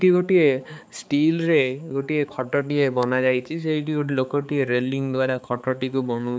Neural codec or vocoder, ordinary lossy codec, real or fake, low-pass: codec, 16 kHz, 4 kbps, X-Codec, HuBERT features, trained on balanced general audio; none; fake; none